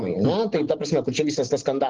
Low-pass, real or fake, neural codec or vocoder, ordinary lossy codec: 7.2 kHz; real; none; Opus, 16 kbps